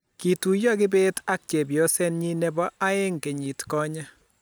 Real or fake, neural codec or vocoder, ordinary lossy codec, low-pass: real; none; none; none